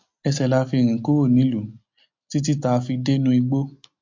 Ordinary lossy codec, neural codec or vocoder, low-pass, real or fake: MP3, 48 kbps; none; 7.2 kHz; real